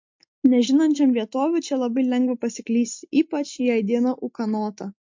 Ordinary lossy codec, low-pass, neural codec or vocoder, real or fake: MP3, 48 kbps; 7.2 kHz; none; real